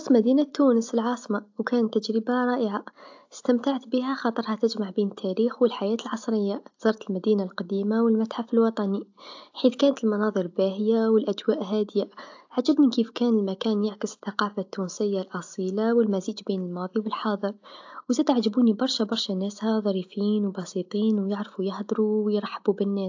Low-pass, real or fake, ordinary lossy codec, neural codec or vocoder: 7.2 kHz; real; AAC, 48 kbps; none